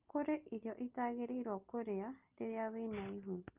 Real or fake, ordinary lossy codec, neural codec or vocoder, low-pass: real; Opus, 32 kbps; none; 3.6 kHz